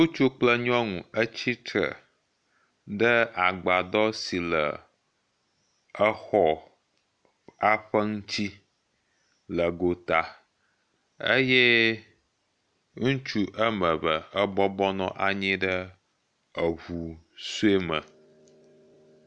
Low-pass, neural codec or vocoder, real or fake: 9.9 kHz; none; real